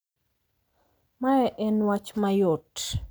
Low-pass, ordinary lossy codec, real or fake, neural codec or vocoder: none; none; real; none